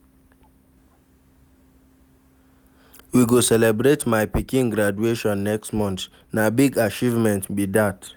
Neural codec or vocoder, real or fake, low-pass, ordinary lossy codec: none; real; none; none